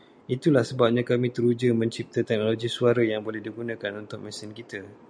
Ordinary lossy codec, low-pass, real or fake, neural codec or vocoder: MP3, 96 kbps; 9.9 kHz; real; none